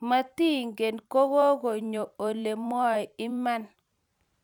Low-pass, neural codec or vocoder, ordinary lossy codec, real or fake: 19.8 kHz; vocoder, 44.1 kHz, 128 mel bands every 512 samples, BigVGAN v2; none; fake